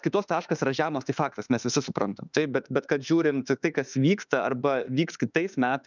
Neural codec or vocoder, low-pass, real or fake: autoencoder, 48 kHz, 32 numbers a frame, DAC-VAE, trained on Japanese speech; 7.2 kHz; fake